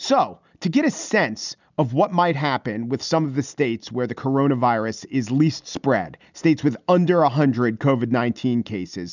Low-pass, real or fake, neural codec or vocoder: 7.2 kHz; real; none